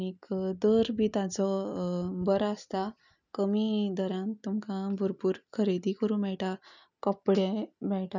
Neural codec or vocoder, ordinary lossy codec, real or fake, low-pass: none; none; real; 7.2 kHz